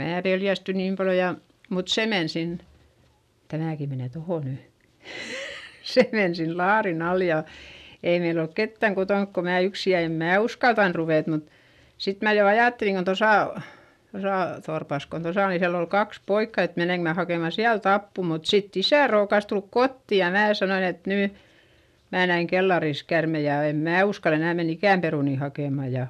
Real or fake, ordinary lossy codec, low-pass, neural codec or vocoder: real; none; 14.4 kHz; none